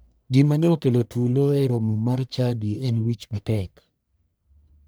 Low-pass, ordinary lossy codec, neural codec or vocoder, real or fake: none; none; codec, 44.1 kHz, 1.7 kbps, Pupu-Codec; fake